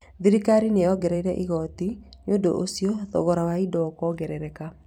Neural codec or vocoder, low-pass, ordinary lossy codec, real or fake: vocoder, 44.1 kHz, 128 mel bands every 256 samples, BigVGAN v2; 19.8 kHz; none; fake